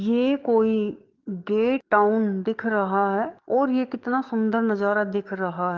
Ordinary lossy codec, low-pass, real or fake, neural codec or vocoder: Opus, 32 kbps; 7.2 kHz; fake; codec, 44.1 kHz, 7.8 kbps, DAC